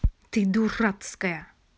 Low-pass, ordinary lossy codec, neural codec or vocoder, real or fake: none; none; none; real